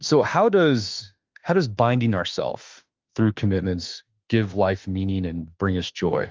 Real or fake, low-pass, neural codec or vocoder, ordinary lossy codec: fake; 7.2 kHz; autoencoder, 48 kHz, 32 numbers a frame, DAC-VAE, trained on Japanese speech; Opus, 24 kbps